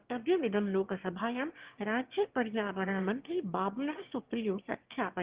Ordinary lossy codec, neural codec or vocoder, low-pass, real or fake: Opus, 16 kbps; autoencoder, 22.05 kHz, a latent of 192 numbers a frame, VITS, trained on one speaker; 3.6 kHz; fake